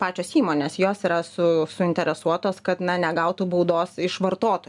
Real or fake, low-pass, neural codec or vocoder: real; 10.8 kHz; none